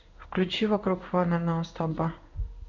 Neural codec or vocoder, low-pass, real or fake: codec, 16 kHz in and 24 kHz out, 1 kbps, XY-Tokenizer; 7.2 kHz; fake